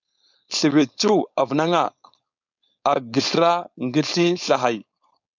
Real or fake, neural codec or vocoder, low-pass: fake; codec, 16 kHz, 4.8 kbps, FACodec; 7.2 kHz